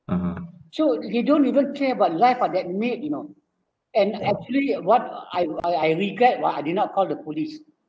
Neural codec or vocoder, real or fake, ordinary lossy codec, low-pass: none; real; none; none